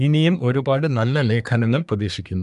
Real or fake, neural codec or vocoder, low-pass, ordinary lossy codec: fake; codec, 24 kHz, 1 kbps, SNAC; 10.8 kHz; MP3, 96 kbps